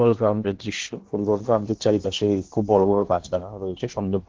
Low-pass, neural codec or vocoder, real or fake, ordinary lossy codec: 7.2 kHz; codec, 16 kHz in and 24 kHz out, 0.8 kbps, FocalCodec, streaming, 65536 codes; fake; Opus, 16 kbps